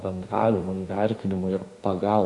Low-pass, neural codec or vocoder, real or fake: 10.8 kHz; autoencoder, 48 kHz, 32 numbers a frame, DAC-VAE, trained on Japanese speech; fake